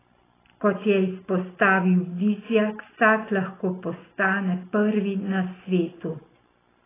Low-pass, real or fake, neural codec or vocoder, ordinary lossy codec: 3.6 kHz; real; none; AAC, 16 kbps